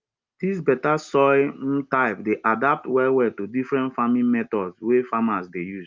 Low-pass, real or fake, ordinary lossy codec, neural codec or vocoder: 7.2 kHz; real; Opus, 24 kbps; none